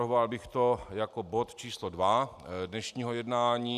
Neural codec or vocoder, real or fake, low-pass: none; real; 14.4 kHz